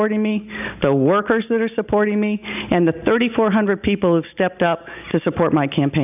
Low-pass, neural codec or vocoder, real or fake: 3.6 kHz; none; real